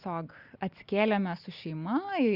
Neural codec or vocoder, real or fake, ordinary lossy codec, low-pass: none; real; Opus, 64 kbps; 5.4 kHz